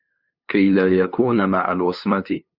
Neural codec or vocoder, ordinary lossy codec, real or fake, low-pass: codec, 16 kHz, 2 kbps, FunCodec, trained on LibriTTS, 25 frames a second; Opus, 64 kbps; fake; 5.4 kHz